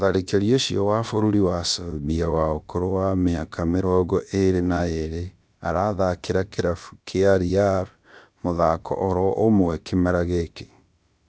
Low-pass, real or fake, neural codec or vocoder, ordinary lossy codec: none; fake; codec, 16 kHz, about 1 kbps, DyCAST, with the encoder's durations; none